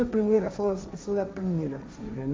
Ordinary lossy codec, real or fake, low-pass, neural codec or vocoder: none; fake; none; codec, 16 kHz, 1.1 kbps, Voila-Tokenizer